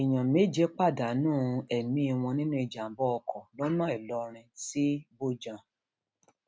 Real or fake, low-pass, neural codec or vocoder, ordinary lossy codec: real; none; none; none